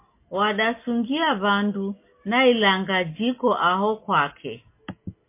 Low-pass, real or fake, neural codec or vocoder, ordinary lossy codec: 3.6 kHz; real; none; MP3, 24 kbps